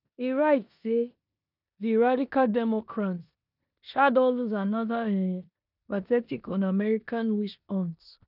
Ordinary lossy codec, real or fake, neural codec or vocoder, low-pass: none; fake; codec, 16 kHz in and 24 kHz out, 0.9 kbps, LongCat-Audio-Codec, four codebook decoder; 5.4 kHz